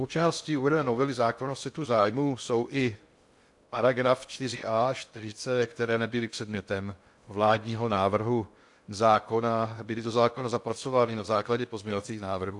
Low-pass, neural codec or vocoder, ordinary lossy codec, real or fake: 10.8 kHz; codec, 16 kHz in and 24 kHz out, 0.6 kbps, FocalCodec, streaming, 2048 codes; AAC, 64 kbps; fake